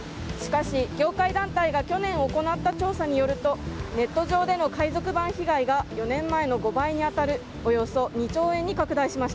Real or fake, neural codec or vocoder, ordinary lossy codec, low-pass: real; none; none; none